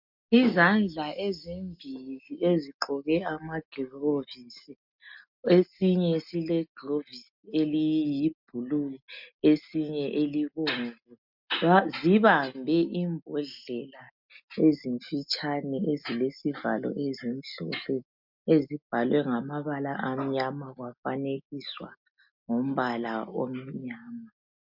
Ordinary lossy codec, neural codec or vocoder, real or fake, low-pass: MP3, 48 kbps; none; real; 5.4 kHz